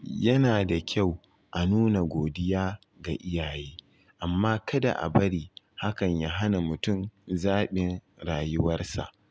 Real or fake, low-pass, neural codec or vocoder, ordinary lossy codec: real; none; none; none